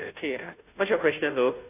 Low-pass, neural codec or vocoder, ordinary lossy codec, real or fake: 3.6 kHz; codec, 16 kHz, 0.5 kbps, FunCodec, trained on Chinese and English, 25 frames a second; AAC, 24 kbps; fake